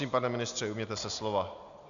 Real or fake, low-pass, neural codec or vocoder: real; 7.2 kHz; none